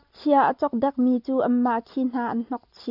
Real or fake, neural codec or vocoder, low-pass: real; none; 5.4 kHz